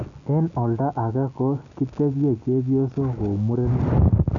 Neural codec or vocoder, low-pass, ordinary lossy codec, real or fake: none; 7.2 kHz; none; real